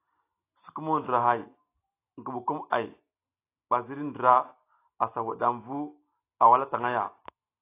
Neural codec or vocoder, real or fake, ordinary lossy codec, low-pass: none; real; AAC, 24 kbps; 3.6 kHz